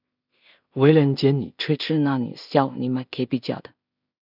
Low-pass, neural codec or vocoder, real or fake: 5.4 kHz; codec, 16 kHz in and 24 kHz out, 0.4 kbps, LongCat-Audio-Codec, two codebook decoder; fake